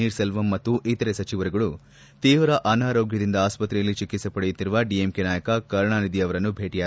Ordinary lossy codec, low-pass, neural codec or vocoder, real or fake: none; none; none; real